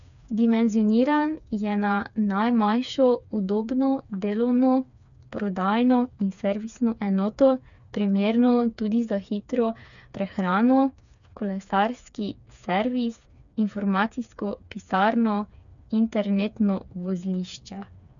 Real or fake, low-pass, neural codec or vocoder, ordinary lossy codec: fake; 7.2 kHz; codec, 16 kHz, 4 kbps, FreqCodec, smaller model; none